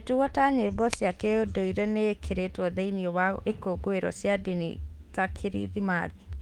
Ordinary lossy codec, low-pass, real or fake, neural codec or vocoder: Opus, 32 kbps; 19.8 kHz; fake; autoencoder, 48 kHz, 32 numbers a frame, DAC-VAE, trained on Japanese speech